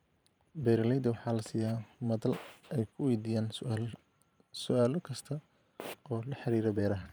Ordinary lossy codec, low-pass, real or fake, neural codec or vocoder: none; none; fake; vocoder, 44.1 kHz, 128 mel bands every 512 samples, BigVGAN v2